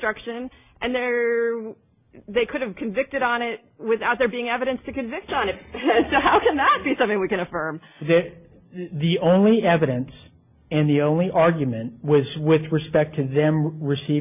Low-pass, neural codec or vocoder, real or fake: 3.6 kHz; none; real